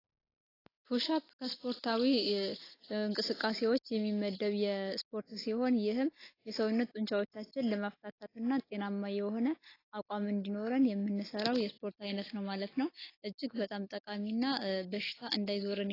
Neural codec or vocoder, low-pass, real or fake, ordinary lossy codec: none; 5.4 kHz; real; AAC, 24 kbps